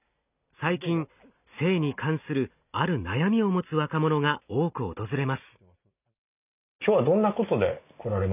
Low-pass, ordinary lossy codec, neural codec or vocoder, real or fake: 3.6 kHz; none; none; real